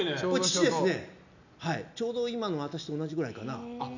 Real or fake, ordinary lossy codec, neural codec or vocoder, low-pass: real; none; none; 7.2 kHz